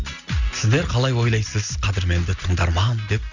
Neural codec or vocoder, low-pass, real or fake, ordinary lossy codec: none; 7.2 kHz; real; none